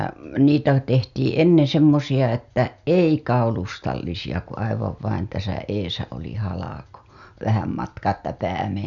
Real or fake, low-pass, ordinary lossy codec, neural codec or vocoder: real; 7.2 kHz; none; none